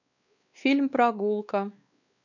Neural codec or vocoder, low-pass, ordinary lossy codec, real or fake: codec, 16 kHz, 2 kbps, X-Codec, WavLM features, trained on Multilingual LibriSpeech; 7.2 kHz; none; fake